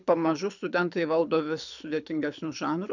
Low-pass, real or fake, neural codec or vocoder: 7.2 kHz; fake; codec, 24 kHz, 6 kbps, HILCodec